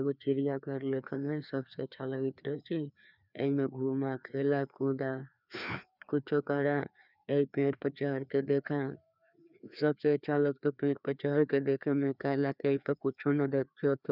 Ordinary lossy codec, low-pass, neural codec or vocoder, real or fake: none; 5.4 kHz; codec, 16 kHz, 2 kbps, FreqCodec, larger model; fake